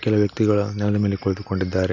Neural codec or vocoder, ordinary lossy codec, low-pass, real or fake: none; none; 7.2 kHz; real